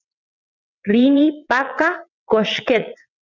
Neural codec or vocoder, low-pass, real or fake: vocoder, 22.05 kHz, 80 mel bands, WaveNeXt; 7.2 kHz; fake